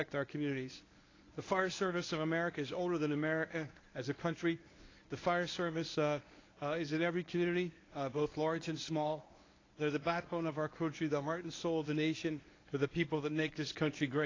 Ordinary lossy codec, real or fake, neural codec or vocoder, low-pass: AAC, 32 kbps; fake; codec, 24 kHz, 0.9 kbps, WavTokenizer, medium speech release version 1; 7.2 kHz